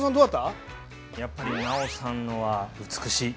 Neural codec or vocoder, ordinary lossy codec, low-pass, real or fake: none; none; none; real